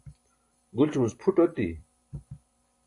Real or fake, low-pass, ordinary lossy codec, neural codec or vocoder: fake; 10.8 kHz; AAC, 48 kbps; vocoder, 44.1 kHz, 128 mel bands every 512 samples, BigVGAN v2